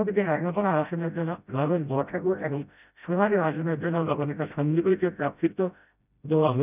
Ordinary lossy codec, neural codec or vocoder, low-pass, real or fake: none; codec, 16 kHz, 0.5 kbps, FreqCodec, smaller model; 3.6 kHz; fake